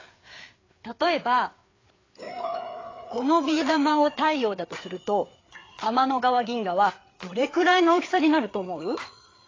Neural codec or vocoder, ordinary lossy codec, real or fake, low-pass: codec, 16 kHz, 4 kbps, FreqCodec, larger model; AAC, 32 kbps; fake; 7.2 kHz